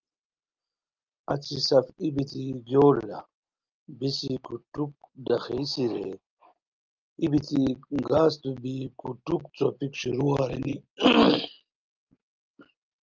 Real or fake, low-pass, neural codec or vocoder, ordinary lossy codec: real; 7.2 kHz; none; Opus, 24 kbps